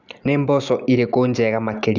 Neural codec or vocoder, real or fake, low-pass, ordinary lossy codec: none; real; 7.2 kHz; none